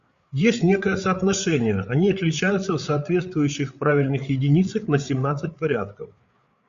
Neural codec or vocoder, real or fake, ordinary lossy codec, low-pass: codec, 16 kHz, 8 kbps, FreqCodec, larger model; fake; Opus, 64 kbps; 7.2 kHz